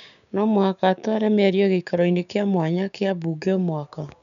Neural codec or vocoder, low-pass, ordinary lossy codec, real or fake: codec, 16 kHz, 6 kbps, DAC; 7.2 kHz; none; fake